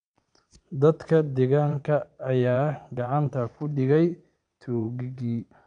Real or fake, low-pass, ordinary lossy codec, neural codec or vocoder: fake; 9.9 kHz; none; vocoder, 22.05 kHz, 80 mel bands, Vocos